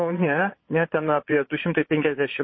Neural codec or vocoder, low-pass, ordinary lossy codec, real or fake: vocoder, 22.05 kHz, 80 mel bands, Vocos; 7.2 kHz; MP3, 24 kbps; fake